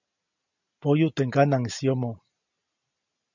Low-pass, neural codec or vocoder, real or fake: 7.2 kHz; none; real